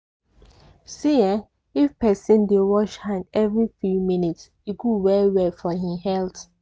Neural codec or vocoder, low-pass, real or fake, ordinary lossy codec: none; none; real; none